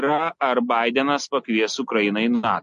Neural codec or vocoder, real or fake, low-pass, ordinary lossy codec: none; real; 14.4 kHz; MP3, 48 kbps